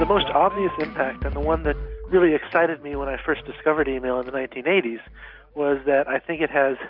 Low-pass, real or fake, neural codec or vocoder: 5.4 kHz; real; none